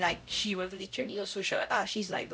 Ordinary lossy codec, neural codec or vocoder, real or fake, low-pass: none; codec, 16 kHz, 0.5 kbps, X-Codec, HuBERT features, trained on LibriSpeech; fake; none